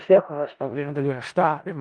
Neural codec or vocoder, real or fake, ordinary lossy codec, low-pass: codec, 16 kHz in and 24 kHz out, 0.4 kbps, LongCat-Audio-Codec, four codebook decoder; fake; Opus, 24 kbps; 9.9 kHz